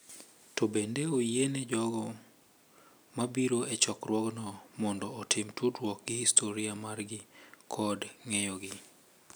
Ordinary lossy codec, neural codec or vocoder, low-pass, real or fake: none; none; none; real